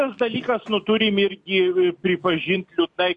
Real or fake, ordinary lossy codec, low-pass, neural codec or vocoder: real; MP3, 48 kbps; 10.8 kHz; none